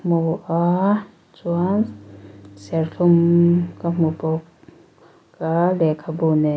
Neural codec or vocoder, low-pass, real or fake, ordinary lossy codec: none; none; real; none